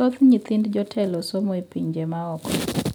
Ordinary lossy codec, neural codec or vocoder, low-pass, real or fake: none; none; none; real